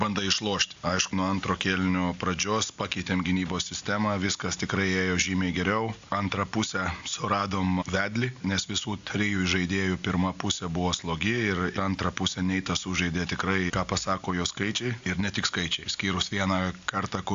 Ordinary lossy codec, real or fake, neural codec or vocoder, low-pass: AAC, 64 kbps; real; none; 7.2 kHz